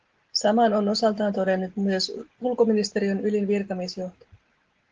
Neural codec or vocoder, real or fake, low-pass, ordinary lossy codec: none; real; 7.2 kHz; Opus, 16 kbps